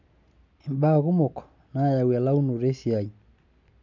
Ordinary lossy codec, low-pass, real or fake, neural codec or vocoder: none; 7.2 kHz; real; none